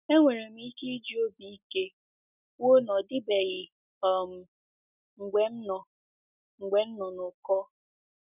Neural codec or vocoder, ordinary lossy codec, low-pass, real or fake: none; none; 3.6 kHz; real